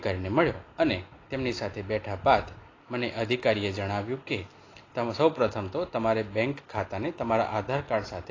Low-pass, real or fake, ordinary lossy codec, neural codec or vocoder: 7.2 kHz; real; AAC, 32 kbps; none